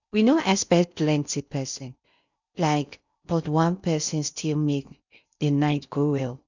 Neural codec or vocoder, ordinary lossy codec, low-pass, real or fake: codec, 16 kHz in and 24 kHz out, 0.6 kbps, FocalCodec, streaming, 4096 codes; none; 7.2 kHz; fake